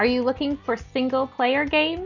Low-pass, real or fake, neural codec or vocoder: 7.2 kHz; real; none